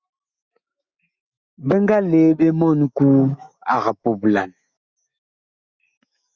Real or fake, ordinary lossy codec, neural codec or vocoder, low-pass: fake; Opus, 64 kbps; autoencoder, 48 kHz, 128 numbers a frame, DAC-VAE, trained on Japanese speech; 7.2 kHz